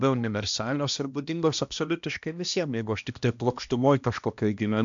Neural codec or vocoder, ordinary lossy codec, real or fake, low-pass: codec, 16 kHz, 1 kbps, X-Codec, HuBERT features, trained on balanced general audio; MP3, 64 kbps; fake; 7.2 kHz